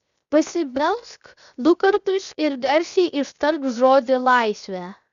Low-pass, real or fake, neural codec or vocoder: 7.2 kHz; fake; codec, 16 kHz, 0.7 kbps, FocalCodec